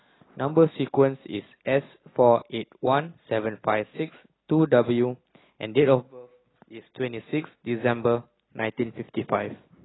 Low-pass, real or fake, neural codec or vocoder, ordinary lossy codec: 7.2 kHz; real; none; AAC, 16 kbps